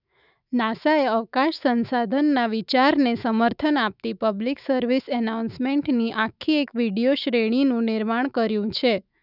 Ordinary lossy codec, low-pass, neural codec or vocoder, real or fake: none; 5.4 kHz; autoencoder, 48 kHz, 128 numbers a frame, DAC-VAE, trained on Japanese speech; fake